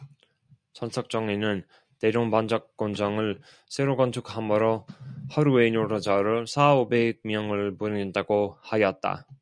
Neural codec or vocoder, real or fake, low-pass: none; real; 9.9 kHz